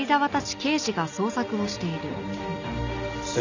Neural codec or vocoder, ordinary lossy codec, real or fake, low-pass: none; none; real; 7.2 kHz